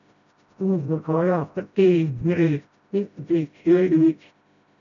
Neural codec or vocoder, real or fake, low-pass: codec, 16 kHz, 0.5 kbps, FreqCodec, smaller model; fake; 7.2 kHz